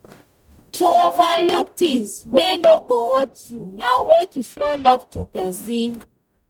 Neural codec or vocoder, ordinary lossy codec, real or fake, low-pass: codec, 44.1 kHz, 0.9 kbps, DAC; none; fake; 19.8 kHz